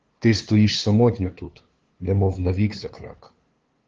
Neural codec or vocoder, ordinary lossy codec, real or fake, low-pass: codec, 16 kHz, 2 kbps, FunCodec, trained on LibriTTS, 25 frames a second; Opus, 16 kbps; fake; 7.2 kHz